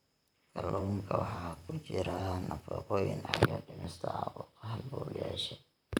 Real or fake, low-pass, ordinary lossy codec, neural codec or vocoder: fake; none; none; vocoder, 44.1 kHz, 128 mel bands, Pupu-Vocoder